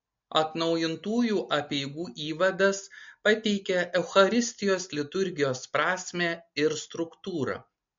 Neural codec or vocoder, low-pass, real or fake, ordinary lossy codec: none; 7.2 kHz; real; MP3, 48 kbps